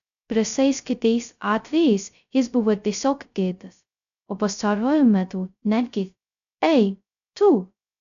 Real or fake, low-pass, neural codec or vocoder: fake; 7.2 kHz; codec, 16 kHz, 0.2 kbps, FocalCodec